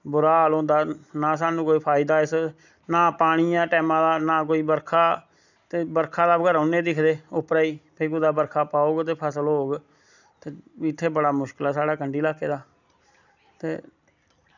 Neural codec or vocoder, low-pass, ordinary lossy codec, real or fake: none; 7.2 kHz; none; real